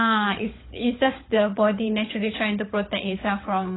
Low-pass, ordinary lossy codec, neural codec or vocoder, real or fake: 7.2 kHz; AAC, 16 kbps; codec, 16 kHz, 16 kbps, FunCodec, trained on LibriTTS, 50 frames a second; fake